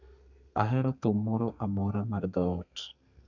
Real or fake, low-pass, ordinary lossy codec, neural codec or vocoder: fake; 7.2 kHz; none; codec, 32 kHz, 1.9 kbps, SNAC